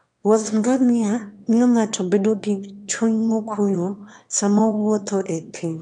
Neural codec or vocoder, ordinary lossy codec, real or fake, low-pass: autoencoder, 22.05 kHz, a latent of 192 numbers a frame, VITS, trained on one speaker; MP3, 96 kbps; fake; 9.9 kHz